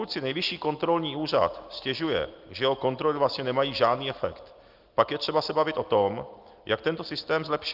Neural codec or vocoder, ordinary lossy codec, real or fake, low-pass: none; Opus, 32 kbps; real; 5.4 kHz